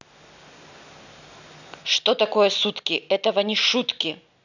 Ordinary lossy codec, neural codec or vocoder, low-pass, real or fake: none; none; 7.2 kHz; real